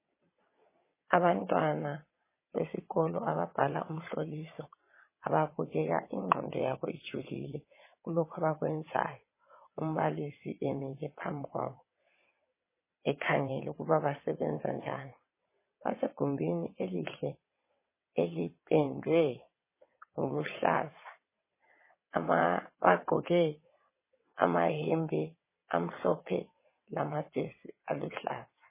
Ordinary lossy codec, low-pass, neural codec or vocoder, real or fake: MP3, 16 kbps; 3.6 kHz; vocoder, 22.05 kHz, 80 mel bands, WaveNeXt; fake